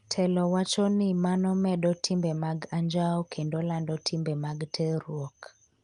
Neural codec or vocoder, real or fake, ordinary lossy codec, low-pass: none; real; Opus, 32 kbps; 10.8 kHz